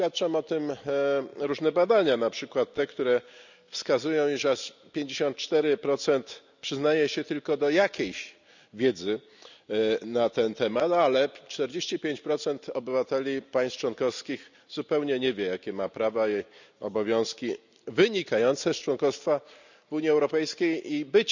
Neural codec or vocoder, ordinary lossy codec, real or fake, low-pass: none; none; real; 7.2 kHz